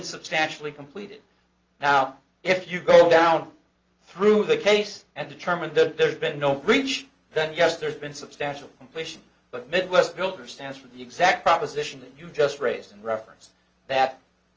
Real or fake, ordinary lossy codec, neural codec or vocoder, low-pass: real; Opus, 24 kbps; none; 7.2 kHz